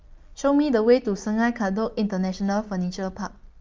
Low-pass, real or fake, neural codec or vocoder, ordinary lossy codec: 7.2 kHz; real; none; Opus, 32 kbps